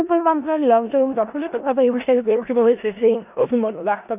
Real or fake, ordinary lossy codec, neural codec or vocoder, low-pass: fake; none; codec, 16 kHz in and 24 kHz out, 0.4 kbps, LongCat-Audio-Codec, four codebook decoder; 3.6 kHz